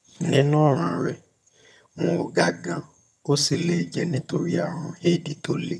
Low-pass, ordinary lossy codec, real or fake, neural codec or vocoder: none; none; fake; vocoder, 22.05 kHz, 80 mel bands, HiFi-GAN